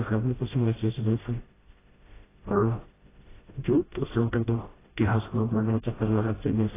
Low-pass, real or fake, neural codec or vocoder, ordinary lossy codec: 3.6 kHz; fake; codec, 16 kHz, 0.5 kbps, FreqCodec, smaller model; AAC, 16 kbps